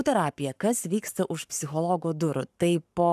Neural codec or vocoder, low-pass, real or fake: codec, 44.1 kHz, 7.8 kbps, Pupu-Codec; 14.4 kHz; fake